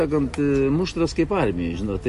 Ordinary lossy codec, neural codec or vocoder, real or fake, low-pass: MP3, 48 kbps; none; real; 14.4 kHz